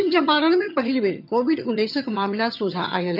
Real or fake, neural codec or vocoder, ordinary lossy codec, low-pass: fake; vocoder, 22.05 kHz, 80 mel bands, HiFi-GAN; none; 5.4 kHz